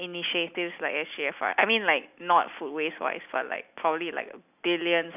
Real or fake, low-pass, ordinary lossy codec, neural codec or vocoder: real; 3.6 kHz; none; none